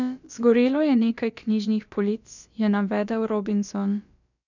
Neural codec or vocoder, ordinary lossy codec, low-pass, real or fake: codec, 16 kHz, about 1 kbps, DyCAST, with the encoder's durations; none; 7.2 kHz; fake